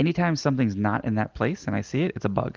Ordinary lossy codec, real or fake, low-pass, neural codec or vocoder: Opus, 24 kbps; real; 7.2 kHz; none